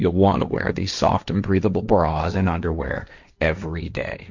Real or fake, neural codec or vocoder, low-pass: fake; codec, 16 kHz, 1.1 kbps, Voila-Tokenizer; 7.2 kHz